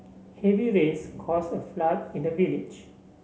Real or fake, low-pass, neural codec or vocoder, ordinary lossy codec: real; none; none; none